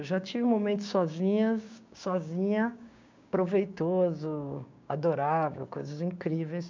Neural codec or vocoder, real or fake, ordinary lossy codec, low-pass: codec, 16 kHz, 6 kbps, DAC; fake; MP3, 64 kbps; 7.2 kHz